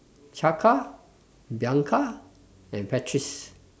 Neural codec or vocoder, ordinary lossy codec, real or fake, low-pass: none; none; real; none